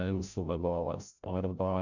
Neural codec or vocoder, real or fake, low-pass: codec, 16 kHz, 0.5 kbps, FreqCodec, larger model; fake; 7.2 kHz